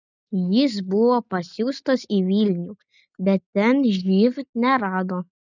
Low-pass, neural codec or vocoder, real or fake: 7.2 kHz; none; real